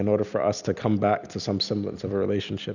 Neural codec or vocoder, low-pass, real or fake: none; 7.2 kHz; real